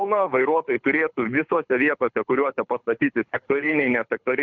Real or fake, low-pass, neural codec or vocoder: fake; 7.2 kHz; codec, 24 kHz, 6 kbps, HILCodec